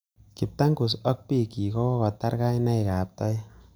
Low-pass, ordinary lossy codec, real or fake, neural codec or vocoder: none; none; real; none